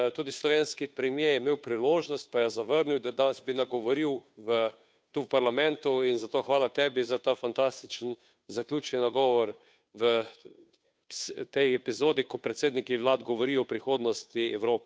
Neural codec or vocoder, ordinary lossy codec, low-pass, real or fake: codec, 16 kHz, 2 kbps, FunCodec, trained on Chinese and English, 25 frames a second; none; none; fake